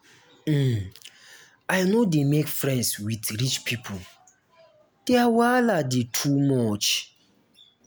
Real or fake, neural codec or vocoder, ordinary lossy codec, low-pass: real; none; none; none